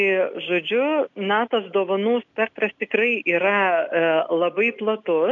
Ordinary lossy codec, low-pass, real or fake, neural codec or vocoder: MP3, 96 kbps; 7.2 kHz; real; none